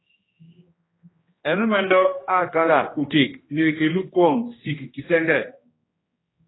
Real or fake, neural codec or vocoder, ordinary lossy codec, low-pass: fake; codec, 16 kHz, 1 kbps, X-Codec, HuBERT features, trained on general audio; AAC, 16 kbps; 7.2 kHz